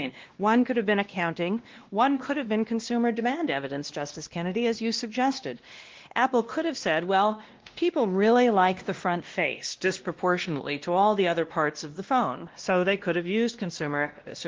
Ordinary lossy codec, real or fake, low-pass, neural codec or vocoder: Opus, 16 kbps; fake; 7.2 kHz; codec, 16 kHz, 1 kbps, X-Codec, WavLM features, trained on Multilingual LibriSpeech